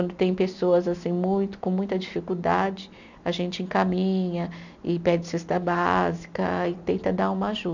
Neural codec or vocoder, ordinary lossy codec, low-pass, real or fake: none; none; 7.2 kHz; real